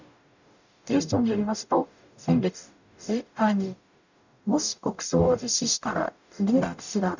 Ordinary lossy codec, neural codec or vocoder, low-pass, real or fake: none; codec, 44.1 kHz, 0.9 kbps, DAC; 7.2 kHz; fake